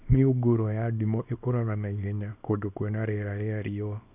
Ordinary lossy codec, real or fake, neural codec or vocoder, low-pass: none; fake; codec, 24 kHz, 0.9 kbps, WavTokenizer, small release; 3.6 kHz